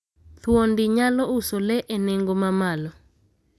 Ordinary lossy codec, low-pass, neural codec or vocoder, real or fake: none; none; none; real